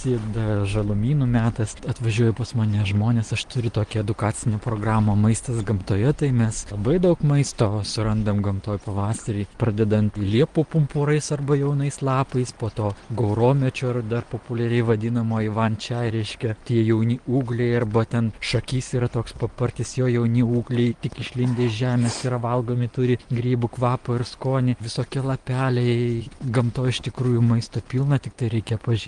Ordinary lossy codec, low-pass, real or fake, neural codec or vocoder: Opus, 24 kbps; 9.9 kHz; real; none